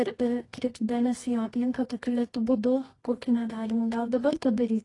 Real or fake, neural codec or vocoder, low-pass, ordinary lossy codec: fake; codec, 24 kHz, 0.9 kbps, WavTokenizer, medium music audio release; 10.8 kHz; AAC, 32 kbps